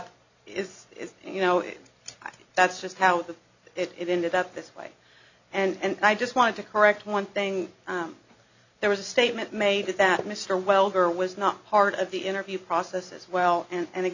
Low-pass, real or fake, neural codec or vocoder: 7.2 kHz; real; none